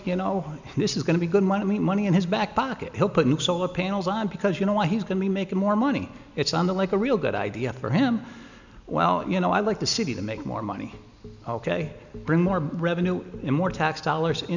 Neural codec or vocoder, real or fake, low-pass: none; real; 7.2 kHz